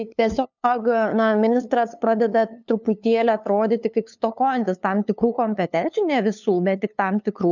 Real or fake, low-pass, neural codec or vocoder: fake; 7.2 kHz; codec, 16 kHz, 2 kbps, FunCodec, trained on LibriTTS, 25 frames a second